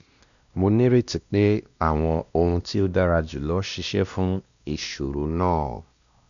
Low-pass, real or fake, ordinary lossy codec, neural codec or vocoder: 7.2 kHz; fake; none; codec, 16 kHz, 1 kbps, X-Codec, WavLM features, trained on Multilingual LibriSpeech